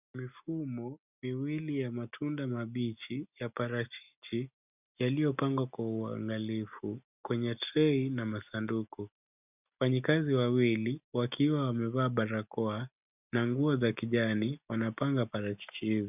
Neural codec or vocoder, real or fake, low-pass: none; real; 3.6 kHz